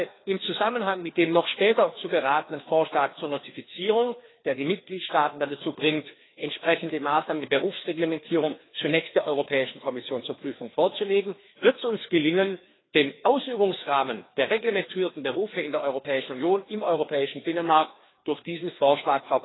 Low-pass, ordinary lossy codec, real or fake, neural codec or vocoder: 7.2 kHz; AAC, 16 kbps; fake; codec, 16 kHz, 2 kbps, FreqCodec, larger model